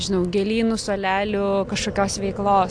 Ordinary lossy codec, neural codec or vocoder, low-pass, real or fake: AAC, 64 kbps; none; 9.9 kHz; real